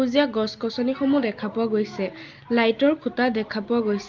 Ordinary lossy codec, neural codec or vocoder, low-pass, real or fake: Opus, 24 kbps; none; 7.2 kHz; real